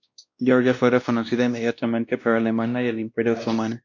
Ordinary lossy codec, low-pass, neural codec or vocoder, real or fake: MP3, 48 kbps; 7.2 kHz; codec, 16 kHz, 1 kbps, X-Codec, WavLM features, trained on Multilingual LibriSpeech; fake